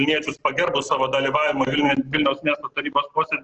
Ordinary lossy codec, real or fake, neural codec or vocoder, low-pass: Opus, 16 kbps; real; none; 7.2 kHz